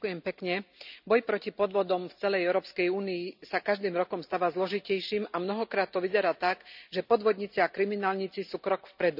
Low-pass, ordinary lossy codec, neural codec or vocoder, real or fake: 5.4 kHz; none; none; real